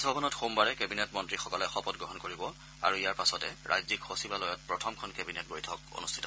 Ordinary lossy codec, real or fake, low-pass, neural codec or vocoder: none; real; none; none